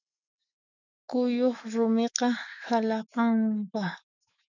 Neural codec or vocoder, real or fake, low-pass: autoencoder, 48 kHz, 128 numbers a frame, DAC-VAE, trained on Japanese speech; fake; 7.2 kHz